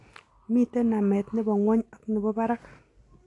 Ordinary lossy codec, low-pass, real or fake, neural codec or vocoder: AAC, 48 kbps; 10.8 kHz; real; none